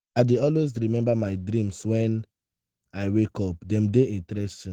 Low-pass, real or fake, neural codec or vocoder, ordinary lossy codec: 19.8 kHz; fake; autoencoder, 48 kHz, 128 numbers a frame, DAC-VAE, trained on Japanese speech; Opus, 16 kbps